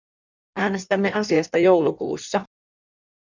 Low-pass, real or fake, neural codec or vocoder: 7.2 kHz; fake; codec, 16 kHz in and 24 kHz out, 1.1 kbps, FireRedTTS-2 codec